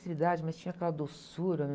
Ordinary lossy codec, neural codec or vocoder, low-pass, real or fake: none; none; none; real